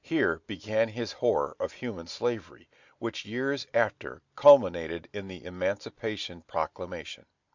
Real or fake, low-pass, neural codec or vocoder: real; 7.2 kHz; none